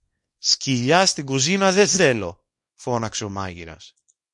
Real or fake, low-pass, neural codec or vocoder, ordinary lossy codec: fake; 10.8 kHz; codec, 24 kHz, 0.9 kbps, WavTokenizer, small release; MP3, 48 kbps